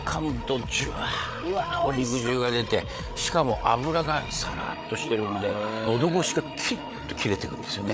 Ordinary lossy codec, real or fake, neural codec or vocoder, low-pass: none; fake; codec, 16 kHz, 8 kbps, FreqCodec, larger model; none